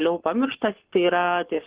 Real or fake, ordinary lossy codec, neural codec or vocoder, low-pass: fake; Opus, 16 kbps; codec, 44.1 kHz, 7.8 kbps, Pupu-Codec; 3.6 kHz